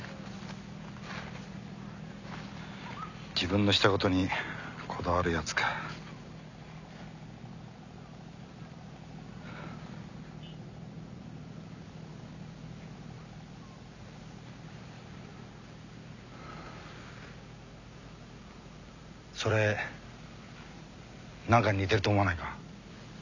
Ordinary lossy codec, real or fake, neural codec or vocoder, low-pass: none; real; none; 7.2 kHz